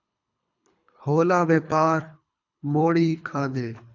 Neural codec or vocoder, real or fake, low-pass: codec, 24 kHz, 3 kbps, HILCodec; fake; 7.2 kHz